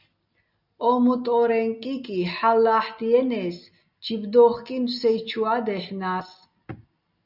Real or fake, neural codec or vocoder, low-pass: real; none; 5.4 kHz